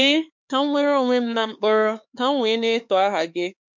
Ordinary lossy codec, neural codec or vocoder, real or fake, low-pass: MP3, 48 kbps; codec, 16 kHz, 4 kbps, X-Codec, HuBERT features, trained on LibriSpeech; fake; 7.2 kHz